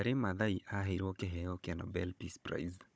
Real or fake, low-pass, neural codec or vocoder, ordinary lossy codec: fake; none; codec, 16 kHz, 16 kbps, FunCodec, trained on Chinese and English, 50 frames a second; none